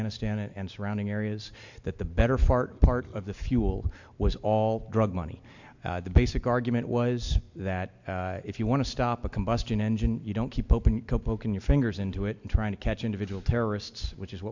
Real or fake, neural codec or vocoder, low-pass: real; none; 7.2 kHz